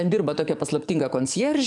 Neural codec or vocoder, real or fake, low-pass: codec, 44.1 kHz, 7.8 kbps, DAC; fake; 10.8 kHz